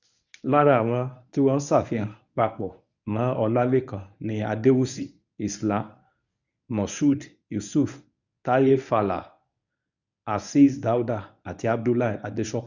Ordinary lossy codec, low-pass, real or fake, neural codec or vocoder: none; 7.2 kHz; fake; codec, 24 kHz, 0.9 kbps, WavTokenizer, medium speech release version 1